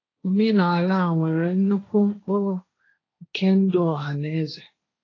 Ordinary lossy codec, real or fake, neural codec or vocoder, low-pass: AAC, 32 kbps; fake; codec, 16 kHz, 1.1 kbps, Voila-Tokenizer; 7.2 kHz